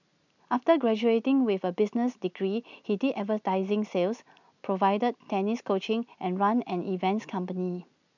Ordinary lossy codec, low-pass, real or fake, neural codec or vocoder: none; 7.2 kHz; real; none